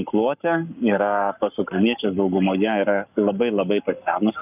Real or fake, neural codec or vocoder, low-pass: fake; codec, 44.1 kHz, 7.8 kbps, Pupu-Codec; 3.6 kHz